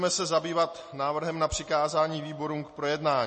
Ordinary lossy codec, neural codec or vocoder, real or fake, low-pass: MP3, 32 kbps; none; real; 10.8 kHz